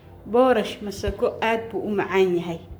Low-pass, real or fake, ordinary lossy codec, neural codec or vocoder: none; fake; none; codec, 44.1 kHz, 7.8 kbps, Pupu-Codec